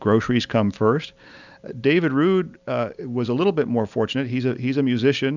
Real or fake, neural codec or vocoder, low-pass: real; none; 7.2 kHz